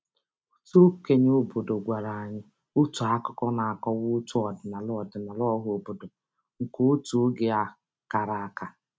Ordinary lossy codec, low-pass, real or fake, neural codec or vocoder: none; none; real; none